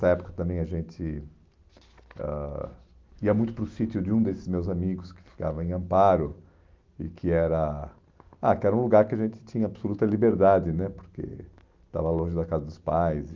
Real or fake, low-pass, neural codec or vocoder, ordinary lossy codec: real; 7.2 kHz; none; Opus, 32 kbps